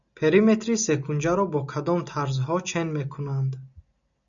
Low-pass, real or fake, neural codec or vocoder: 7.2 kHz; real; none